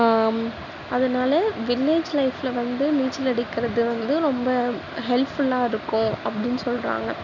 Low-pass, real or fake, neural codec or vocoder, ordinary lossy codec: 7.2 kHz; real; none; none